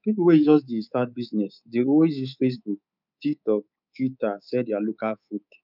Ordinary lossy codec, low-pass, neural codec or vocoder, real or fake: none; 5.4 kHz; codec, 24 kHz, 3.1 kbps, DualCodec; fake